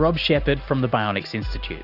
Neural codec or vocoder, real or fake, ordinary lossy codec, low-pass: none; real; Opus, 64 kbps; 5.4 kHz